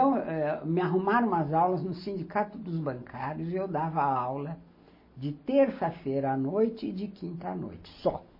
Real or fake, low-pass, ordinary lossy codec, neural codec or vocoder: real; 5.4 kHz; MP3, 24 kbps; none